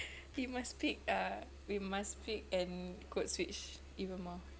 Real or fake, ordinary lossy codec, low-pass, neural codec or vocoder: real; none; none; none